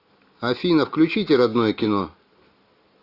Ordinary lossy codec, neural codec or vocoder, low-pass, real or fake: AAC, 32 kbps; none; 5.4 kHz; real